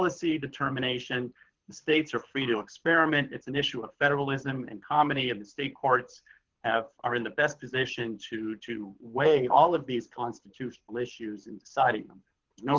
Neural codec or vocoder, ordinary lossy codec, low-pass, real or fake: codec, 24 kHz, 6 kbps, HILCodec; Opus, 16 kbps; 7.2 kHz; fake